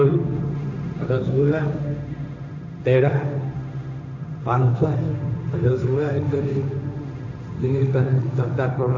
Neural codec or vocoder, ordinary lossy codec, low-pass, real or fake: codec, 16 kHz, 1.1 kbps, Voila-Tokenizer; none; 7.2 kHz; fake